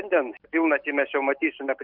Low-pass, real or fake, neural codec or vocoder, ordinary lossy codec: 5.4 kHz; real; none; Opus, 32 kbps